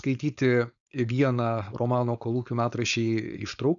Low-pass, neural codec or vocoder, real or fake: 7.2 kHz; codec, 16 kHz, 4.8 kbps, FACodec; fake